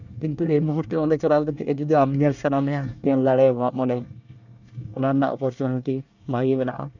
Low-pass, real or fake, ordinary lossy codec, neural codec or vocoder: 7.2 kHz; fake; none; codec, 24 kHz, 1 kbps, SNAC